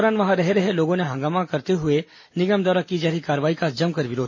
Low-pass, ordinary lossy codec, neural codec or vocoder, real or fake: 7.2 kHz; MP3, 32 kbps; none; real